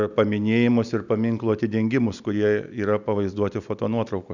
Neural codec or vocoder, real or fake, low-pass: none; real; 7.2 kHz